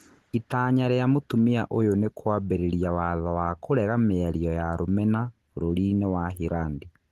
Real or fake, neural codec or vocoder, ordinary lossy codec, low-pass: real; none; Opus, 16 kbps; 14.4 kHz